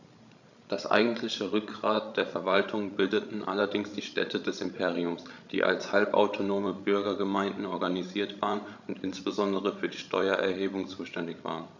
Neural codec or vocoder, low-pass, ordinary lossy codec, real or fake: codec, 16 kHz, 16 kbps, FreqCodec, larger model; 7.2 kHz; none; fake